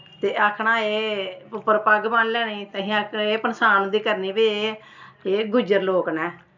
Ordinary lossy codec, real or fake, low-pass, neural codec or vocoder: none; real; 7.2 kHz; none